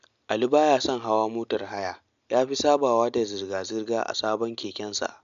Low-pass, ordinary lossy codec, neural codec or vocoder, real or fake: 7.2 kHz; MP3, 64 kbps; none; real